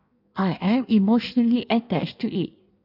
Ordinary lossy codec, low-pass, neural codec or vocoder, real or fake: none; 5.4 kHz; codec, 16 kHz in and 24 kHz out, 1.1 kbps, FireRedTTS-2 codec; fake